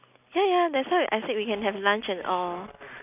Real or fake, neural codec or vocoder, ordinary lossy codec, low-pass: real; none; none; 3.6 kHz